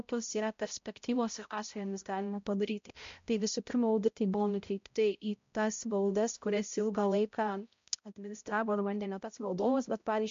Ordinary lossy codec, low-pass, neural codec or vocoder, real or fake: MP3, 48 kbps; 7.2 kHz; codec, 16 kHz, 0.5 kbps, X-Codec, HuBERT features, trained on balanced general audio; fake